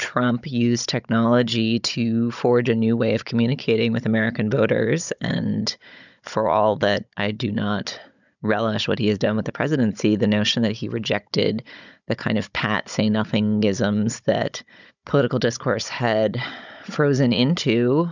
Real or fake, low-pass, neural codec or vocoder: fake; 7.2 kHz; codec, 16 kHz, 16 kbps, FunCodec, trained on Chinese and English, 50 frames a second